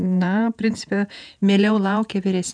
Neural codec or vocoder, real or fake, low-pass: vocoder, 48 kHz, 128 mel bands, Vocos; fake; 9.9 kHz